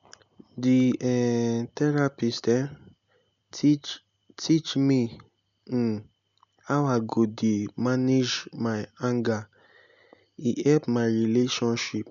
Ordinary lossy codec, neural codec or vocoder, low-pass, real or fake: none; none; 7.2 kHz; real